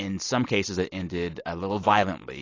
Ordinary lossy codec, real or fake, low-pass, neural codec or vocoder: AAC, 32 kbps; real; 7.2 kHz; none